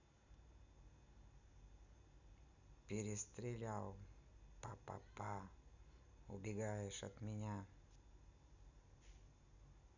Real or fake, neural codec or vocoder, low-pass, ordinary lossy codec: real; none; 7.2 kHz; none